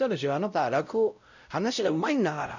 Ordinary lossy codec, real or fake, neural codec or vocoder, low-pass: none; fake; codec, 16 kHz, 0.5 kbps, X-Codec, WavLM features, trained on Multilingual LibriSpeech; 7.2 kHz